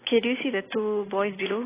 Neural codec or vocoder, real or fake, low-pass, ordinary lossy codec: none; real; 3.6 kHz; AAC, 16 kbps